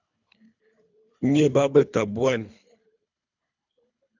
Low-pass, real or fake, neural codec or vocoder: 7.2 kHz; fake; codec, 24 kHz, 3 kbps, HILCodec